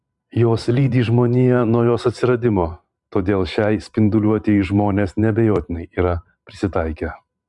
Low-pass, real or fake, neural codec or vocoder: 10.8 kHz; fake; vocoder, 44.1 kHz, 128 mel bands every 512 samples, BigVGAN v2